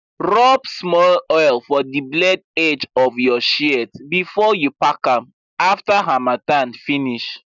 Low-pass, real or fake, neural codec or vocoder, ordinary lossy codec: 7.2 kHz; real; none; none